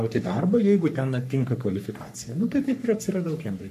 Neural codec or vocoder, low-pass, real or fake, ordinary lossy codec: codec, 44.1 kHz, 3.4 kbps, Pupu-Codec; 14.4 kHz; fake; AAC, 96 kbps